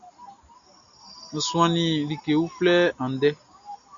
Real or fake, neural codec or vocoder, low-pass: real; none; 7.2 kHz